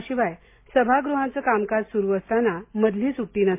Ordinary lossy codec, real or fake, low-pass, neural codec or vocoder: MP3, 24 kbps; real; 3.6 kHz; none